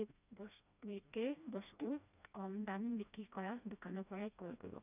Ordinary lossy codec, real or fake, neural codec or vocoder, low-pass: none; fake; codec, 16 kHz, 1 kbps, FreqCodec, smaller model; 3.6 kHz